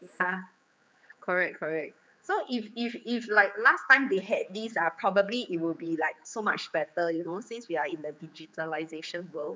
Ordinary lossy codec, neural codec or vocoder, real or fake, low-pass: none; codec, 16 kHz, 4 kbps, X-Codec, HuBERT features, trained on general audio; fake; none